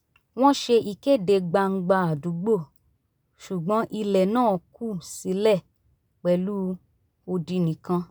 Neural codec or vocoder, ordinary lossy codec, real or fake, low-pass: none; none; real; none